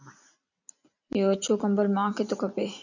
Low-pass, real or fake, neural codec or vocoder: 7.2 kHz; real; none